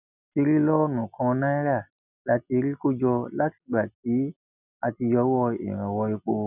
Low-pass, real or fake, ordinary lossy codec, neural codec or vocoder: 3.6 kHz; real; none; none